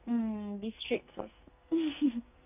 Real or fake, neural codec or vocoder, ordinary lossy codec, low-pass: fake; codec, 44.1 kHz, 2.6 kbps, SNAC; none; 3.6 kHz